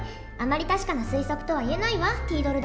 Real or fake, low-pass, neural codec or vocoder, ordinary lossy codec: real; none; none; none